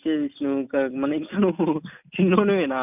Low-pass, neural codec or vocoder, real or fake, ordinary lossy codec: 3.6 kHz; none; real; none